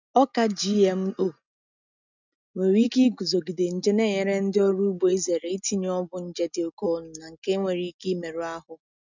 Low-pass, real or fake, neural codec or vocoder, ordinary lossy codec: 7.2 kHz; real; none; none